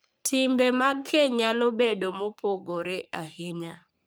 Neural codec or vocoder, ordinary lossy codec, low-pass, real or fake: codec, 44.1 kHz, 3.4 kbps, Pupu-Codec; none; none; fake